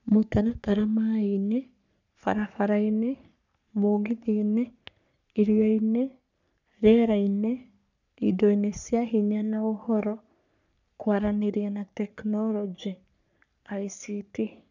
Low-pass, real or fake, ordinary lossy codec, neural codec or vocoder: 7.2 kHz; fake; none; codec, 44.1 kHz, 3.4 kbps, Pupu-Codec